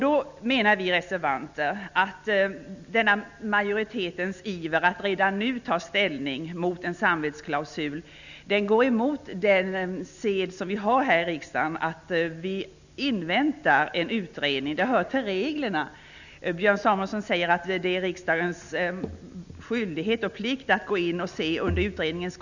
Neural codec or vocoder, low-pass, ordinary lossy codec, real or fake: none; 7.2 kHz; none; real